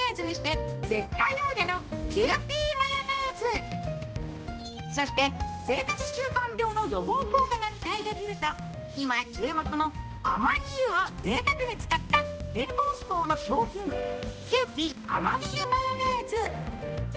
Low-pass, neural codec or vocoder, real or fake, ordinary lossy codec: none; codec, 16 kHz, 1 kbps, X-Codec, HuBERT features, trained on balanced general audio; fake; none